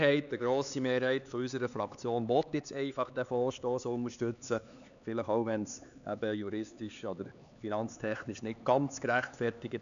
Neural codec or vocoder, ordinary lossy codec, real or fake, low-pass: codec, 16 kHz, 4 kbps, X-Codec, HuBERT features, trained on LibriSpeech; none; fake; 7.2 kHz